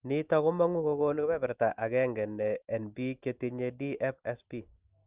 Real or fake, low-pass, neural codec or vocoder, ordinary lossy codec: real; 3.6 kHz; none; Opus, 32 kbps